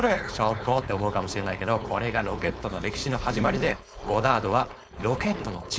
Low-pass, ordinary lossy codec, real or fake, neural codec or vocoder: none; none; fake; codec, 16 kHz, 4.8 kbps, FACodec